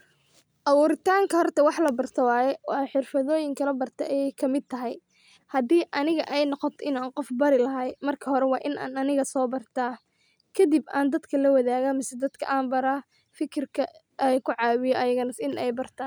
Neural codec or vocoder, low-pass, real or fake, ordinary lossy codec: none; none; real; none